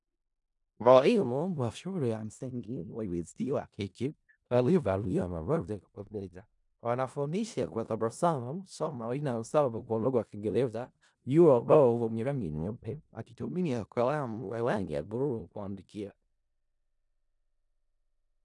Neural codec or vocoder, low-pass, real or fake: codec, 16 kHz in and 24 kHz out, 0.4 kbps, LongCat-Audio-Codec, four codebook decoder; 10.8 kHz; fake